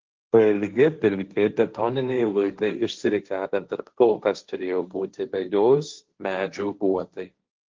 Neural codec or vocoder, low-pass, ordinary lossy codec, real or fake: codec, 16 kHz, 1.1 kbps, Voila-Tokenizer; 7.2 kHz; Opus, 32 kbps; fake